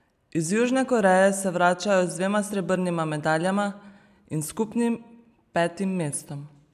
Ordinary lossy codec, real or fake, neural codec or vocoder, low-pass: none; real; none; 14.4 kHz